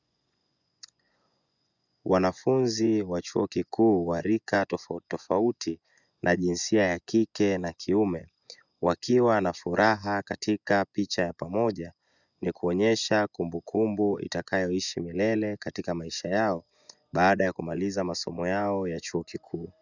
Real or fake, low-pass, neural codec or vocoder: fake; 7.2 kHz; vocoder, 24 kHz, 100 mel bands, Vocos